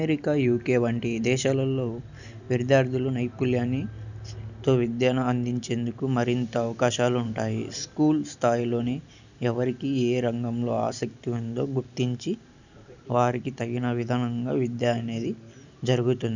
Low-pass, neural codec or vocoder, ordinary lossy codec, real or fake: 7.2 kHz; none; none; real